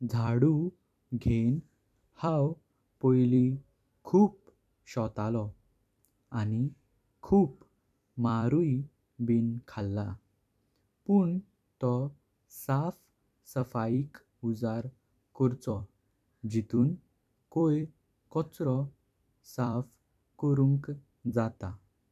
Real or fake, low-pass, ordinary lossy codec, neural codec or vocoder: fake; 14.4 kHz; none; vocoder, 44.1 kHz, 128 mel bands every 256 samples, BigVGAN v2